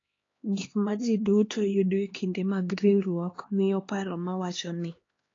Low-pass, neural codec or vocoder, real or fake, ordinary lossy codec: 7.2 kHz; codec, 16 kHz, 2 kbps, X-Codec, HuBERT features, trained on LibriSpeech; fake; AAC, 32 kbps